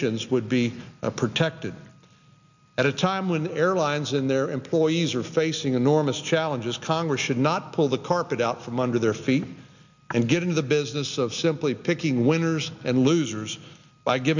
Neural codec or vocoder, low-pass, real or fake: none; 7.2 kHz; real